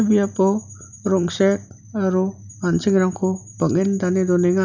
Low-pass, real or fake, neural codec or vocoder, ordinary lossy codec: 7.2 kHz; real; none; none